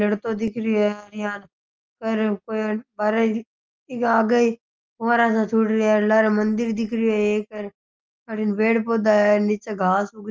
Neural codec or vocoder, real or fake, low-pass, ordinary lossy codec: none; real; 7.2 kHz; Opus, 24 kbps